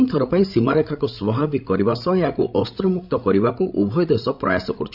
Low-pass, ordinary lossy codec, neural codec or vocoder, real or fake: 5.4 kHz; none; codec, 16 kHz, 16 kbps, FreqCodec, larger model; fake